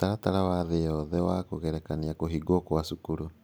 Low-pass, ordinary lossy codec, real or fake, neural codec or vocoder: none; none; real; none